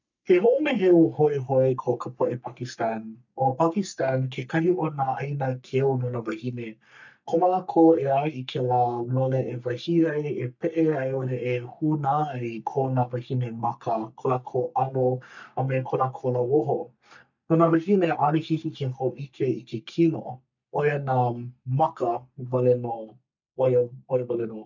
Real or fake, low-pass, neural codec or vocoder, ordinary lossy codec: fake; 7.2 kHz; codec, 44.1 kHz, 3.4 kbps, Pupu-Codec; none